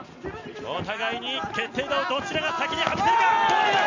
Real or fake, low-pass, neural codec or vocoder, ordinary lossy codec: real; 7.2 kHz; none; MP3, 64 kbps